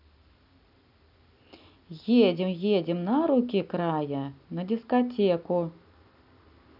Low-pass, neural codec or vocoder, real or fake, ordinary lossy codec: 5.4 kHz; none; real; none